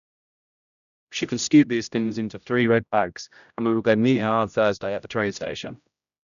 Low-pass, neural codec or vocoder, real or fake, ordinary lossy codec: 7.2 kHz; codec, 16 kHz, 0.5 kbps, X-Codec, HuBERT features, trained on general audio; fake; none